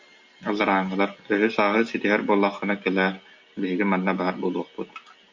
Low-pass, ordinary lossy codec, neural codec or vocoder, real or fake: 7.2 kHz; MP3, 48 kbps; none; real